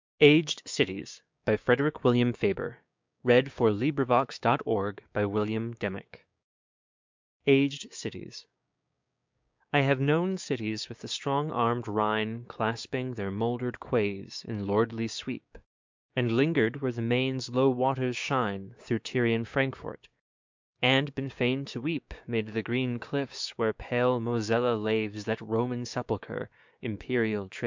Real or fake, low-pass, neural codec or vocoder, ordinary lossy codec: fake; 7.2 kHz; codec, 16 kHz, 6 kbps, DAC; MP3, 64 kbps